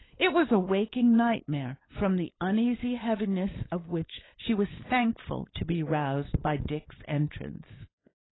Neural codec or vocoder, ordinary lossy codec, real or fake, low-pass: codec, 16 kHz, 8 kbps, FunCodec, trained on LibriTTS, 25 frames a second; AAC, 16 kbps; fake; 7.2 kHz